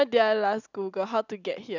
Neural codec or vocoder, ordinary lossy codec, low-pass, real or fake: none; none; 7.2 kHz; real